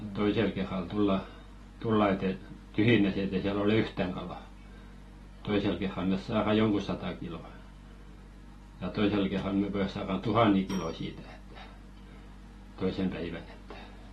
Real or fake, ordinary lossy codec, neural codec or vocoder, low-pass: real; AAC, 32 kbps; none; 19.8 kHz